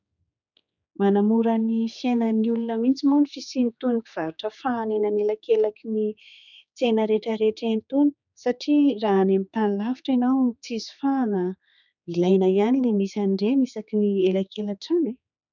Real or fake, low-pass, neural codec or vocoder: fake; 7.2 kHz; codec, 16 kHz, 4 kbps, X-Codec, HuBERT features, trained on general audio